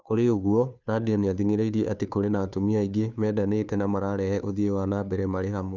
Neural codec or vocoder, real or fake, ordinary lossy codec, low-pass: codec, 16 kHz, 2 kbps, FunCodec, trained on Chinese and English, 25 frames a second; fake; none; 7.2 kHz